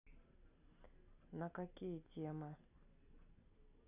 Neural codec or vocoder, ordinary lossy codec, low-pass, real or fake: none; none; 3.6 kHz; real